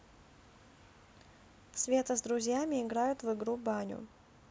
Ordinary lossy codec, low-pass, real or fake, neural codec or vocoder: none; none; real; none